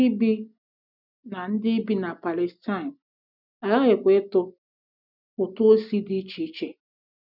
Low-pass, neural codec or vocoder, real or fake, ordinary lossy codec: 5.4 kHz; none; real; none